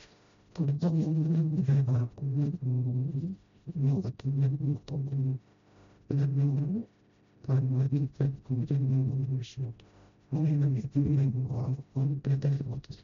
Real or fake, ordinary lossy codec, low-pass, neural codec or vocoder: fake; MP3, 64 kbps; 7.2 kHz; codec, 16 kHz, 0.5 kbps, FreqCodec, smaller model